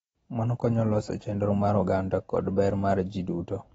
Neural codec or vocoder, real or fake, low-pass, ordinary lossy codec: none; real; 19.8 kHz; AAC, 24 kbps